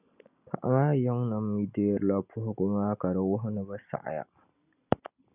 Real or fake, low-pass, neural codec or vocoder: real; 3.6 kHz; none